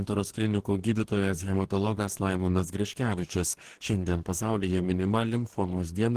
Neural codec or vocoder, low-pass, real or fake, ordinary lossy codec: codec, 44.1 kHz, 2.6 kbps, DAC; 14.4 kHz; fake; Opus, 16 kbps